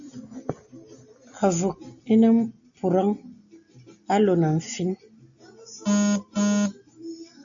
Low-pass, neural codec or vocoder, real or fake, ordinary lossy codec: 7.2 kHz; none; real; AAC, 64 kbps